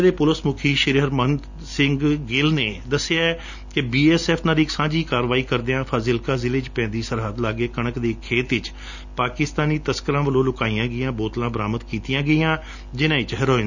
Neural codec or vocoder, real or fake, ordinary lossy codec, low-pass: none; real; none; 7.2 kHz